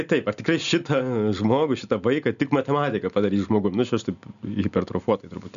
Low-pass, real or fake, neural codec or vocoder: 7.2 kHz; real; none